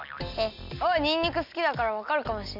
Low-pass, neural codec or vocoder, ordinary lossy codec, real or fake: 5.4 kHz; none; none; real